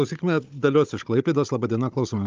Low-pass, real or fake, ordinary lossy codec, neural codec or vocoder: 7.2 kHz; fake; Opus, 24 kbps; codec, 16 kHz, 16 kbps, FunCodec, trained on Chinese and English, 50 frames a second